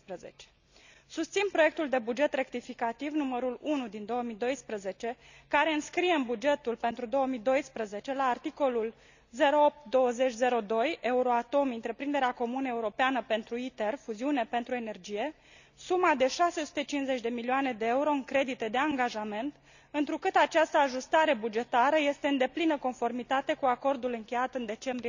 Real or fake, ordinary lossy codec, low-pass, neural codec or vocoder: real; none; 7.2 kHz; none